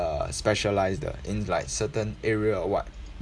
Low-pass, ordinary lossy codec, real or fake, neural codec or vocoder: none; none; real; none